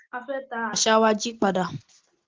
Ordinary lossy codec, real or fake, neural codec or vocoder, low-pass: Opus, 16 kbps; real; none; 7.2 kHz